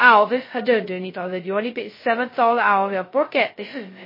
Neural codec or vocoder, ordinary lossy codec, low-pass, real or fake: codec, 16 kHz, 0.2 kbps, FocalCodec; MP3, 24 kbps; 5.4 kHz; fake